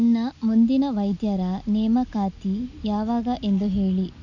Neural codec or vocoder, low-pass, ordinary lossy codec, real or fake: none; 7.2 kHz; none; real